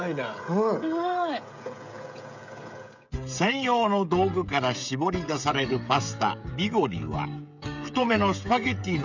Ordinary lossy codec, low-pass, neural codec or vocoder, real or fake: none; 7.2 kHz; codec, 16 kHz, 16 kbps, FreqCodec, smaller model; fake